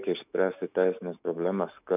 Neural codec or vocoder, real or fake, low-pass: autoencoder, 48 kHz, 128 numbers a frame, DAC-VAE, trained on Japanese speech; fake; 3.6 kHz